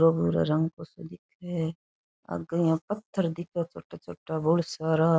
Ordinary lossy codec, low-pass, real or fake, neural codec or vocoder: none; none; real; none